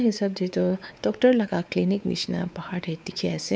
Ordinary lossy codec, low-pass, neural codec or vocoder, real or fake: none; none; codec, 16 kHz, 4 kbps, X-Codec, WavLM features, trained on Multilingual LibriSpeech; fake